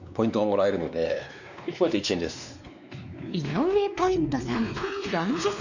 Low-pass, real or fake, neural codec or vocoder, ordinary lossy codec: 7.2 kHz; fake; codec, 16 kHz, 2 kbps, X-Codec, WavLM features, trained on Multilingual LibriSpeech; none